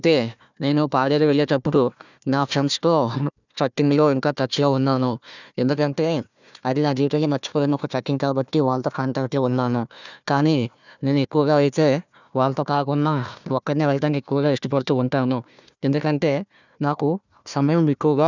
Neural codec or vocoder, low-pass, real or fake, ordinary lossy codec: codec, 16 kHz, 1 kbps, FunCodec, trained on Chinese and English, 50 frames a second; 7.2 kHz; fake; none